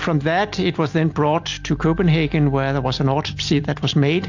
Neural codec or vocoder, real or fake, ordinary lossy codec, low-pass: none; real; AAC, 48 kbps; 7.2 kHz